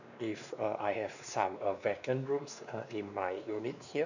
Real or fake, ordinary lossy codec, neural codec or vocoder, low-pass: fake; none; codec, 16 kHz, 2 kbps, X-Codec, WavLM features, trained on Multilingual LibriSpeech; 7.2 kHz